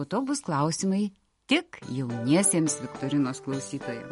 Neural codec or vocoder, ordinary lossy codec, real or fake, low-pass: none; MP3, 48 kbps; real; 19.8 kHz